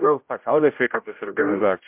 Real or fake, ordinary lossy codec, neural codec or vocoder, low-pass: fake; MP3, 32 kbps; codec, 16 kHz, 0.5 kbps, X-Codec, HuBERT features, trained on general audio; 3.6 kHz